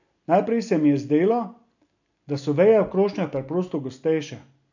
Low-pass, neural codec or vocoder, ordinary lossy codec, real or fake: 7.2 kHz; none; none; real